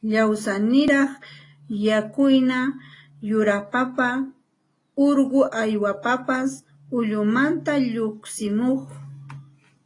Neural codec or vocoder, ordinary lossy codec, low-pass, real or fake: none; AAC, 32 kbps; 10.8 kHz; real